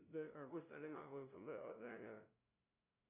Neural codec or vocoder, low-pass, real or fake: codec, 16 kHz, 0.5 kbps, FunCodec, trained on LibriTTS, 25 frames a second; 3.6 kHz; fake